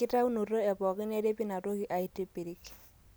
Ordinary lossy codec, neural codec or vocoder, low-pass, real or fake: none; none; none; real